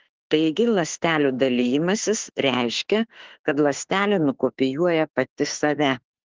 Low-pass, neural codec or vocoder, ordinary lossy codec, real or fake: 7.2 kHz; autoencoder, 48 kHz, 32 numbers a frame, DAC-VAE, trained on Japanese speech; Opus, 16 kbps; fake